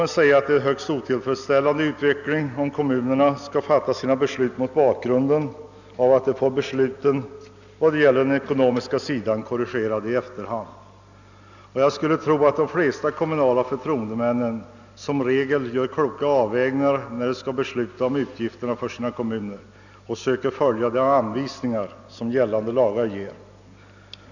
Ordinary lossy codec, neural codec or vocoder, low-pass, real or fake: none; none; 7.2 kHz; real